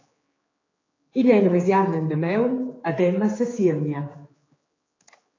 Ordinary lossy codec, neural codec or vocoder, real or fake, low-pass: AAC, 32 kbps; codec, 16 kHz, 4 kbps, X-Codec, HuBERT features, trained on general audio; fake; 7.2 kHz